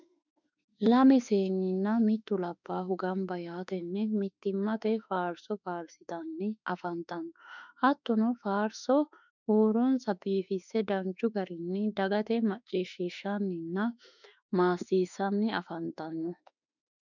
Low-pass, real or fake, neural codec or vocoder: 7.2 kHz; fake; autoencoder, 48 kHz, 32 numbers a frame, DAC-VAE, trained on Japanese speech